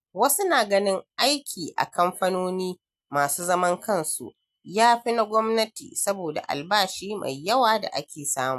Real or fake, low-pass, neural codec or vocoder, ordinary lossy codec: real; 14.4 kHz; none; AAC, 96 kbps